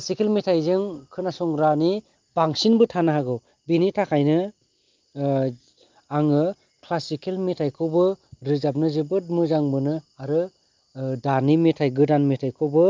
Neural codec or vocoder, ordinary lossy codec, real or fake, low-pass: none; Opus, 32 kbps; real; 7.2 kHz